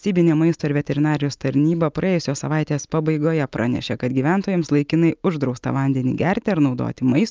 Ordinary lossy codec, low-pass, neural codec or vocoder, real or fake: Opus, 24 kbps; 7.2 kHz; none; real